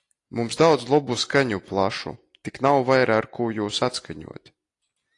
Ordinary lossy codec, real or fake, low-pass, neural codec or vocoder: AAC, 48 kbps; real; 10.8 kHz; none